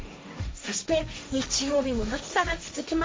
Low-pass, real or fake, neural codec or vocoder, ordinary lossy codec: none; fake; codec, 16 kHz, 1.1 kbps, Voila-Tokenizer; none